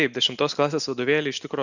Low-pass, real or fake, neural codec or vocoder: 7.2 kHz; real; none